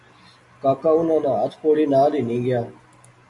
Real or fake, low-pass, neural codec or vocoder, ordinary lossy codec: real; 10.8 kHz; none; AAC, 64 kbps